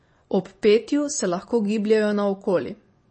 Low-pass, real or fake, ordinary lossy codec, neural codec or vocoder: 9.9 kHz; fake; MP3, 32 kbps; vocoder, 24 kHz, 100 mel bands, Vocos